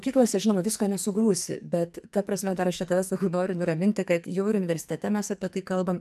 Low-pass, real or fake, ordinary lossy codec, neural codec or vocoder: 14.4 kHz; fake; AAC, 96 kbps; codec, 44.1 kHz, 2.6 kbps, SNAC